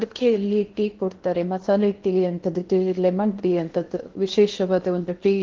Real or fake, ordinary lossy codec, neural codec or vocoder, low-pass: fake; Opus, 16 kbps; codec, 16 kHz in and 24 kHz out, 0.8 kbps, FocalCodec, streaming, 65536 codes; 7.2 kHz